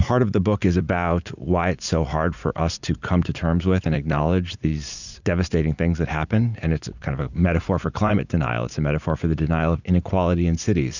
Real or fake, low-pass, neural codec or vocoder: fake; 7.2 kHz; vocoder, 44.1 kHz, 80 mel bands, Vocos